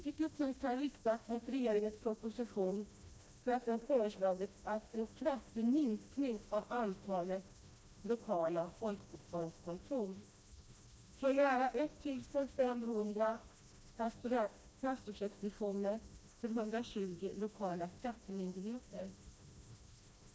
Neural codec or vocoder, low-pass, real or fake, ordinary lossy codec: codec, 16 kHz, 1 kbps, FreqCodec, smaller model; none; fake; none